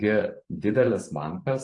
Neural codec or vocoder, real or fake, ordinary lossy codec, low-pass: vocoder, 44.1 kHz, 128 mel bands every 512 samples, BigVGAN v2; fake; AAC, 48 kbps; 10.8 kHz